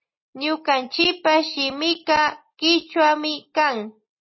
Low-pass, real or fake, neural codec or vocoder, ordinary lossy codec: 7.2 kHz; real; none; MP3, 24 kbps